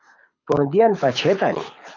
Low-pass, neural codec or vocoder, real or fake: 7.2 kHz; codec, 24 kHz, 6 kbps, HILCodec; fake